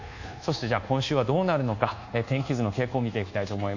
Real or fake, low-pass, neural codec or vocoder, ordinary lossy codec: fake; 7.2 kHz; codec, 24 kHz, 1.2 kbps, DualCodec; none